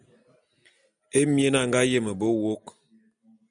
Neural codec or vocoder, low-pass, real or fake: none; 9.9 kHz; real